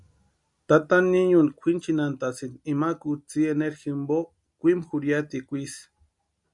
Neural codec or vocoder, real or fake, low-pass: none; real; 10.8 kHz